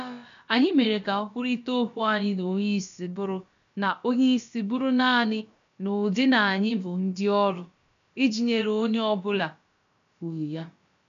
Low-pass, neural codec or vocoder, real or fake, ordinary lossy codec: 7.2 kHz; codec, 16 kHz, about 1 kbps, DyCAST, with the encoder's durations; fake; MP3, 64 kbps